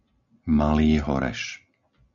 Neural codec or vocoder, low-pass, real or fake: none; 7.2 kHz; real